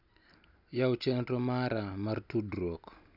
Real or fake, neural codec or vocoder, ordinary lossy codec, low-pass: real; none; none; 5.4 kHz